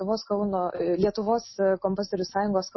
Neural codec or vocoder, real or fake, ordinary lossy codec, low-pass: none; real; MP3, 24 kbps; 7.2 kHz